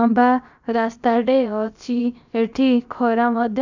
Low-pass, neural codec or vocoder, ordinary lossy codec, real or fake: 7.2 kHz; codec, 16 kHz, about 1 kbps, DyCAST, with the encoder's durations; none; fake